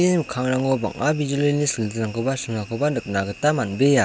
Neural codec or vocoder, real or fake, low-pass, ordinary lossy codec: none; real; none; none